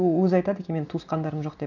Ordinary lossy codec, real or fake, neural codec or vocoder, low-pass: none; real; none; 7.2 kHz